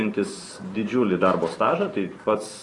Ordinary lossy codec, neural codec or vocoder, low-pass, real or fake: AAC, 32 kbps; vocoder, 44.1 kHz, 128 mel bands every 512 samples, BigVGAN v2; 10.8 kHz; fake